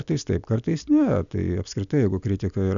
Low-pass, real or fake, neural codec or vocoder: 7.2 kHz; real; none